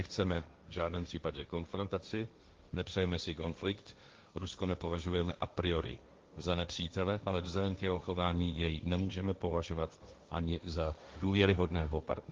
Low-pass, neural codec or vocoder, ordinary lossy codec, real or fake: 7.2 kHz; codec, 16 kHz, 1.1 kbps, Voila-Tokenizer; Opus, 32 kbps; fake